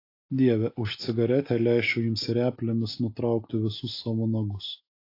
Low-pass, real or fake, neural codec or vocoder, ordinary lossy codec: 5.4 kHz; real; none; AAC, 32 kbps